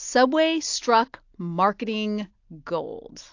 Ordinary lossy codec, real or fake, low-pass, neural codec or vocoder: AAC, 48 kbps; real; 7.2 kHz; none